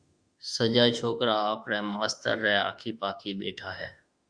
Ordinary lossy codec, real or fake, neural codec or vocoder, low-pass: Opus, 64 kbps; fake; autoencoder, 48 kHz, 32 numbers a frame, DAC-VAE, trained on Japanese speech; 9.9 kHz